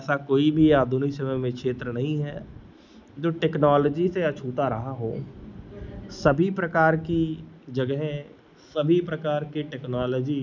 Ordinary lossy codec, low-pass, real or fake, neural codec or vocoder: none; 7.2 kHz; real; none